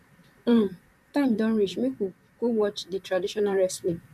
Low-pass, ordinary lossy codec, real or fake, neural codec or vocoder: 14.4 kHz; none; fake; vocoder, 44.1 kHz, 128 mel bands, Pupu-Vocoder